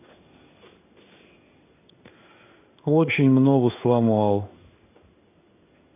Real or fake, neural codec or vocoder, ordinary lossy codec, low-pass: fake; codec, 16 kHz in and 24 kHz out, 1 kbps, XY-Tokenizer; none; 3.6 kHz